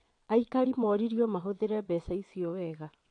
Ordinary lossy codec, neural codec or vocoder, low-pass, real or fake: AAC, 48 kbps; vocoder, 22.05 kHz, 80 mel bands, WaveNeXt; 9.9 kHz; fake